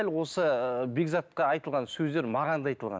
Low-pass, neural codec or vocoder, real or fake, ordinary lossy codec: none; none; real; none